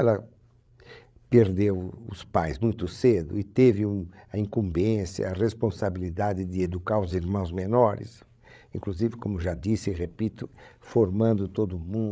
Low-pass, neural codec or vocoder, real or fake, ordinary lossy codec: none; codec, 16 kHz, 16 kbps, FreqCodec, larger model; fake; none